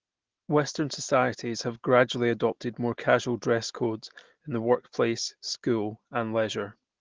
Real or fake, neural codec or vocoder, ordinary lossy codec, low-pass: real; none; Opus, 16 kbps; 7.2 kHz